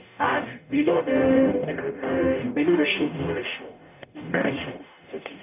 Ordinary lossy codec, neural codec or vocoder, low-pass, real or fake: none; codec, 44.1 kHz, 0.9 kbps, DAC; 3.6 kHz; fake